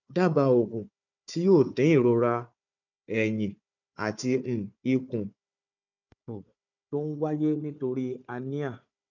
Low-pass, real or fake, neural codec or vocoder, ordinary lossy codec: 7.2 kHz; fake; codec, 16 kHz, 4 kbps, FunCodec, trained on Chinese and English, 50 frames a second; none